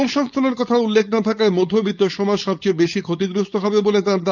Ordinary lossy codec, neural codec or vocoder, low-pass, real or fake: none; codec, 16 kHz, 4.8 kbps, FACodec; 7.2 kHz; fake